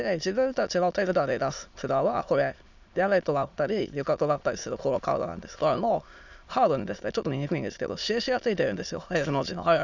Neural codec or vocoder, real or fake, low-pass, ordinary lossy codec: autoencoder, 22.05 kHz, a latent of 192 numbers a frame, VITS, trained on many speakers; fake; 7.2 kHz; none